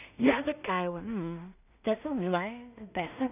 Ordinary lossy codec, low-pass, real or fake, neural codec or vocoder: none; 3.6 kHz; fake; codec, 16 kHz in and 24 kHz out, 0.4 kbps, LongCat-Audio-Codec, two codebook decoder